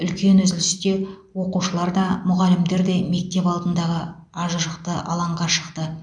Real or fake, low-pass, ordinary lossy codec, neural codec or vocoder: real; 9.9 kHz; none; none